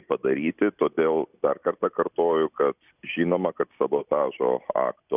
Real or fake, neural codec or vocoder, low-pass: real; none; 3.6 kHz